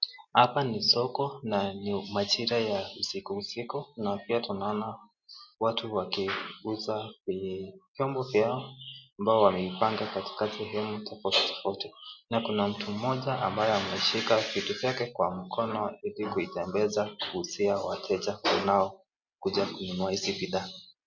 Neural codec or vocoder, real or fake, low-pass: none; real; 7.2 kHz